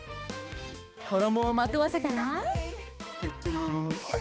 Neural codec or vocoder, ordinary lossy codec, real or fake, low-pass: codec, 16 kHz, 2 kbps, X-Codec, HuBERT features, trained on balanced general audio; none; fake; none